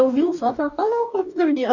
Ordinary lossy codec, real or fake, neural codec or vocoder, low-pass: none; fake; codec, 44.1 kHz, 2.6 kbps, DAC; 7.2 kHz